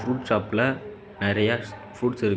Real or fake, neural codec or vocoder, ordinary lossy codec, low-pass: real; none; none; none